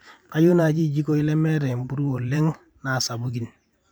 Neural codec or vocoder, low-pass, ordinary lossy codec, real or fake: vocoder, 44.1 kHz, 128 mel bands every 512 samples, BigVGAN v2; none; none; fake